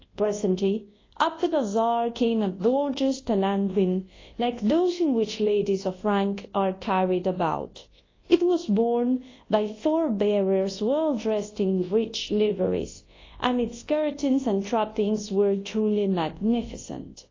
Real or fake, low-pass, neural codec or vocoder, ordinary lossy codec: fake; 7.2 kHz; codec, 24 kHz, 0.9 kbps, WavTokenizer, large speech release; AAC, 32 kbps